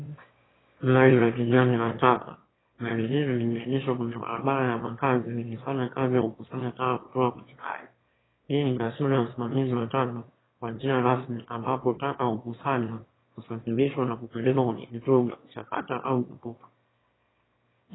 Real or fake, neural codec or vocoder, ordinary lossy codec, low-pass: fake; autoencoder, 22.05 kHz, a latent of 192 numbers a frame, VITS, trained on one speaker; AAC, 16 kbps; 7.2 kHz